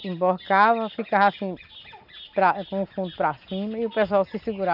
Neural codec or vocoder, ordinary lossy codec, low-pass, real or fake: none; none; 5.4 kHz; real